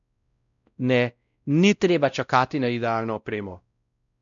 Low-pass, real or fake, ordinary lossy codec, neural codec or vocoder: 7.2 kHz; fake; none; codec, 16 kHz, 0.5 kbps, X-Codec, WavLM features, trained on Multilingual LibriSpeech